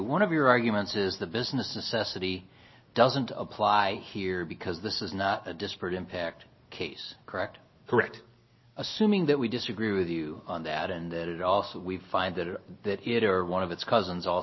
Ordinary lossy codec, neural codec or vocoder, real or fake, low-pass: MP3, 24 kbps; none; real; 7.2 kHz